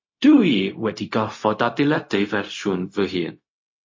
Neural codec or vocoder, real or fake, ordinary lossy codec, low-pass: codec, 16 kHz, 0.4 kbps, LongCat-Audio-Codec; fake; MP3, 32 kbps; 7.2 kHz